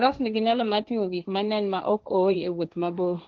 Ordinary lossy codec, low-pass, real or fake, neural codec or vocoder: Opus, 24 kbps; 7.2 kHz; fake; codec, 16 kHz, 1.1 kbps, Voila-Tokenizer